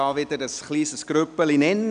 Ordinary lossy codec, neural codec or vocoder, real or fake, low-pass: none; none; real; 9.9 kHz